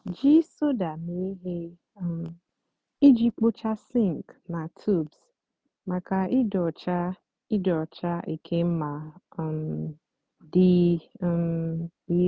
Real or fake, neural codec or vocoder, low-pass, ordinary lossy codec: real; none; none; none